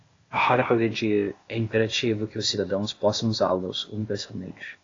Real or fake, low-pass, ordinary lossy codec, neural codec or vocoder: fake; 7.2 kHz; AAC, 32 kbps; codec, 16 kHz, 0.8 kbps, ZipCodec